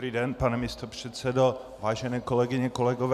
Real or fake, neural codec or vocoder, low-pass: real; none; 14.4 kHz